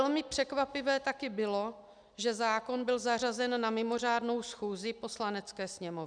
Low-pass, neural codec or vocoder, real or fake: 9.9 kHz; none; real